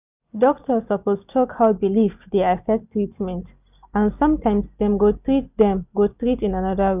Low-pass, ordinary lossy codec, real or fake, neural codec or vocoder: 3.6 kHz; none; real; none